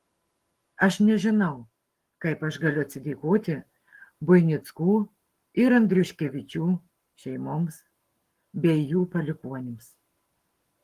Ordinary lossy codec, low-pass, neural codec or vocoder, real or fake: Opus, 24 kbps; 14.4 kHz; codec, 44.1 kHz, 7.8 kbps, Pupu-Codec; fake